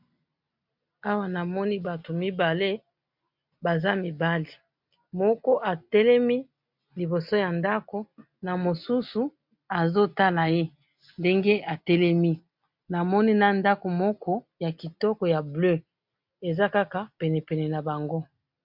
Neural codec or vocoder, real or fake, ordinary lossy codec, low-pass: none; real; MP3, 48 kbps; 5.4 kHz